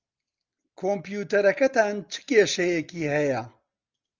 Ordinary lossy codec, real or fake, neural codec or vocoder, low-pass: Opus, 32 kbps; real; none; 7.2 kHz